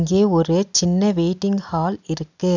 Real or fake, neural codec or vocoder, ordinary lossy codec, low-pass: real; none; none; 7.2 kHz